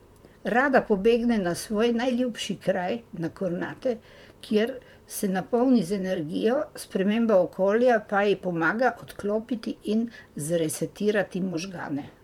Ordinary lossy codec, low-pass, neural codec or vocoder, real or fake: MP3, 96 kbps; 19.8 kHz; vocoder, 44.1 kHz, 128 mel bands, Pupu-Vocoder; fake